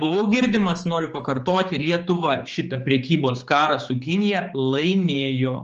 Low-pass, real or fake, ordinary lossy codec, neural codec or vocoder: 7.2 kHz; fake; Opus, 24 kbps; codec, 16 kHz, 4 kbps, X-Codec, HuBERT features, trained on general audio